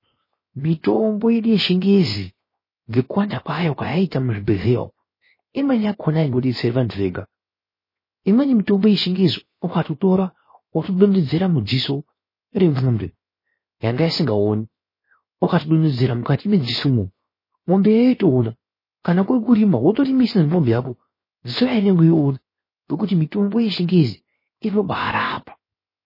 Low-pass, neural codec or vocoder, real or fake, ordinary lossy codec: 5.4 kHz; codec, 16 kHz, 0.7 kbps, FocalCodec; fake; MP3, 24 kbps